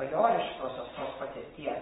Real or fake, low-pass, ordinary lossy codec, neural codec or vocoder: fake; 9.9 kHz; AAC, 16 kbps; vocoder, 22.05 kHz, 80 mel bands, WaveNeXt